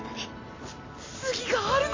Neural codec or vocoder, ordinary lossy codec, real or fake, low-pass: none; AAC, 32 kbps; real; 7.2 kHz